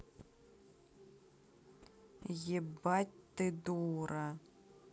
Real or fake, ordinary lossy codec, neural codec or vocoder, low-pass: real; none; none; none